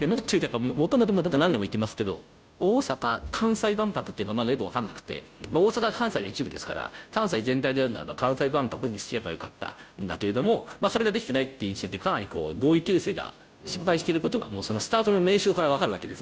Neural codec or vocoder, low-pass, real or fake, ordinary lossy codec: codec, 16 kHz, 0.5 kbps, FunCodec, trained on Chinese and English, 25 frames a second; none; fake; none